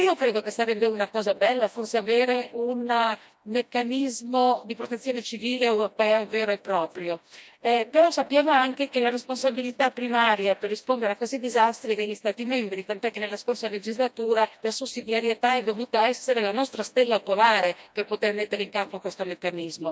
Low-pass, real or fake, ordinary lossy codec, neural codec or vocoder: none; fake; none; codec, 16 kHz, 1 kbps, FreqCodec, smaller model